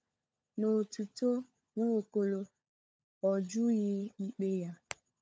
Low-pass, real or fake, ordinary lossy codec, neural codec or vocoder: none; fake; none; codec, 16 kHz, 16 kbps, FunCodec, trained on LibriTTS, 50 frames a second